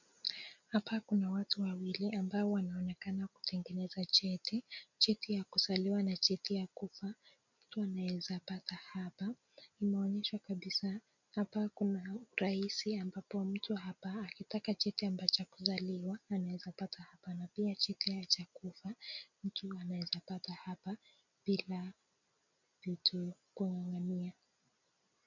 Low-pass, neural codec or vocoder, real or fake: 7.2 kHz; none; real